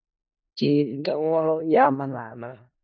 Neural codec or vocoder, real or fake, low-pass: codec, 16 kHz in and 24 kHz out, 0.4 kbps, LongCat-Audio-Codec, four codebook decoder; fake; 7.2 kHz